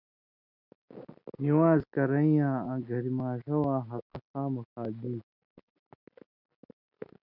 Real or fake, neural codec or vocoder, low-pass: real; none; 5.4 kHz